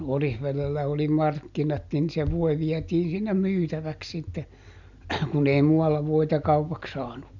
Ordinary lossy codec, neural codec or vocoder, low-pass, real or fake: none; none; 7.2 kHz; real